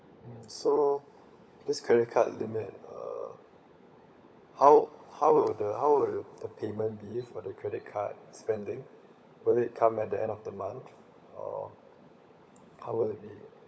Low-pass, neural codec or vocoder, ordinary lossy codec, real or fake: none; codec, 16 kHz, 16 kbps, FunCodec, trained on LibriTTS, 50 frames a second; none; fake